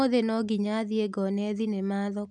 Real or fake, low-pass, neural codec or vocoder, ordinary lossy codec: real; 10.8 kHz; none; none